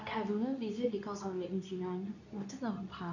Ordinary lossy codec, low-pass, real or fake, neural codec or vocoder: none; 7.2 kHz; fake; codec, 24 kHz, 0.9 kbps, WavTokenizer, medium speech release version 2